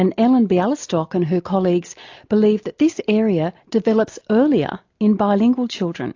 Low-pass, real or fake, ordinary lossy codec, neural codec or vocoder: 7.2 kHz; real; AAC, 48 kbps; none